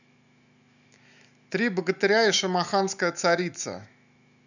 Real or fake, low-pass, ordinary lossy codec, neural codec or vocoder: fake; 7.2 kHz; none; autoencoder, 48 kHz, 128 numbers a frame, DAC-VAE, trained on Japanese speech